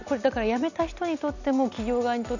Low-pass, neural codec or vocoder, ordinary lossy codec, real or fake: 7.2 kHz; none; none; real